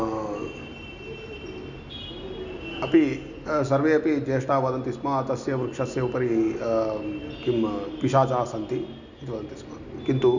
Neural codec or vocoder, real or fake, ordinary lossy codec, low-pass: none; real; none; 7.2 kHz